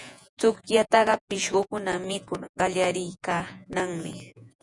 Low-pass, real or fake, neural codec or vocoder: 10.8 kHz; fake; vocoder, 48 kHz, 128 mel bands, Vocos